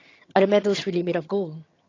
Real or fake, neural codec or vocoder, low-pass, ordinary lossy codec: fake; vocoder, 22.05 kHz, 80 mel bands, HiFi-GAN; 7.2 kHz; AAC, 32 kbps